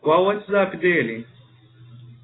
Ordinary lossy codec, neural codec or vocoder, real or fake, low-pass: AAC, 16 kbps; none; real; 7.2 kHz